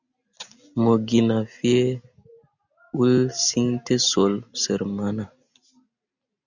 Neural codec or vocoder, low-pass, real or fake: none; 7.2 kHz; real